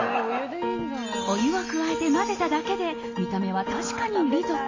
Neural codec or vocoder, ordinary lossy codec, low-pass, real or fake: none; AAC, 32 kbps; 7.2 kHz; real